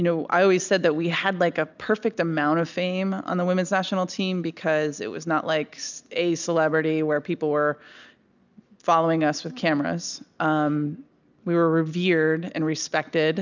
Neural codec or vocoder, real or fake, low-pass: none; real; 7.2 kHz